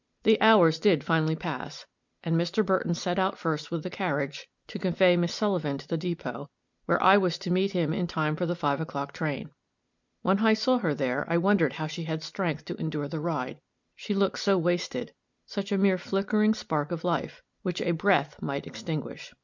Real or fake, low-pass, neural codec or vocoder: real; 7.2 kHz; none